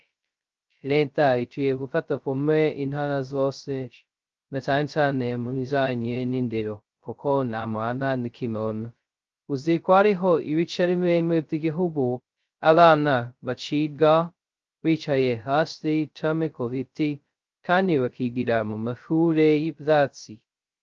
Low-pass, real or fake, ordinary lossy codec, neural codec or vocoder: 7.2 kHz; fake; Opus, 24 kbps; codec, 16 kHz, 0.2 kbps, FocalCodec